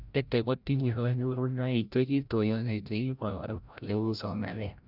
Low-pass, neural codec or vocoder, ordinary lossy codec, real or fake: 5.4 kHz; codec, 16 kHz, 0.5 kbps, FreqCodec, larger model; none; fake